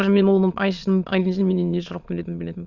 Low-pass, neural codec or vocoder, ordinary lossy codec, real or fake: 7.2 kHz; autoencoder, 22.05 kHz, a latent of 192 numbers a frame, VITS, trained on many speakers; Opus, 64 kbps; fake